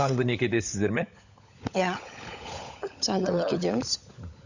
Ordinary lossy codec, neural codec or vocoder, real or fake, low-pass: none; codec, 16 kHz, 16 kbps, FunCodec, trained on LibriTTS, 50 frames a second; fake; 7.2 kHz